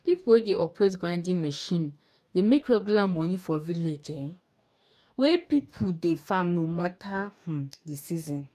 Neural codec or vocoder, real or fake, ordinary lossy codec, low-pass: codec, 44.1 kHz, 2.6 kbps, DAC; fake; none; 14.4 kHz